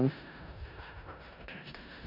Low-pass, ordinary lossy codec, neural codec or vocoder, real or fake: 5.4 kHz; none; codec, 16 kHz in and 24 kHz out, 0.4 kbps, LongCat-Audio-Codec, four codebook decoder; fake